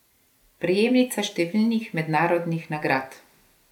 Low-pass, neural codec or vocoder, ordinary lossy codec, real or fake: 19.8 kHz; none; none; real